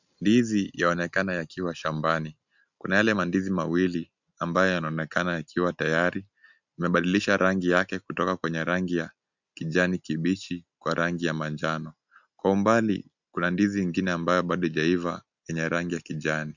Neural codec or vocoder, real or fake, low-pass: none; real; 7.2 kHz